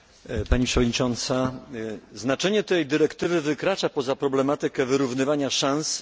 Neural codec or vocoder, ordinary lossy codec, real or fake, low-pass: none; none; real; none